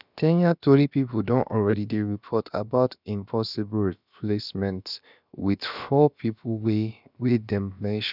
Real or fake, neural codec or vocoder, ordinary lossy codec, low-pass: fake; codec, 16 kHz, about 1 kbps, DyCAST, with the encoder's durations; none; 5.4 kHz